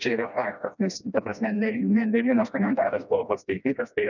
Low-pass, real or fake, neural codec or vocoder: 7.2 kHz; fake; codec, 16 kHz, 1 kbps, FreqCodec, smaller model